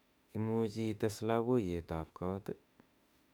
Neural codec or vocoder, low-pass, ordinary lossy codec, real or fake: autoencoder, 48 kHz, 32 numbers a frame, DAC-VAE, trained on Japanese speech; 19.8 kHz; none; fake